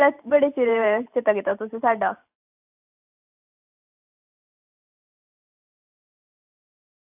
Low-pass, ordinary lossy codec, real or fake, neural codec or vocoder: 3.6 kHz; AAC, 32 kbps; fake; vocoder, 44.1 kHz, 128 mel bands every 512 samples, BigVGAN v2